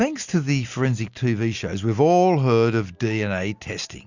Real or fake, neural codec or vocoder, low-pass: real; none; 7.2 kHz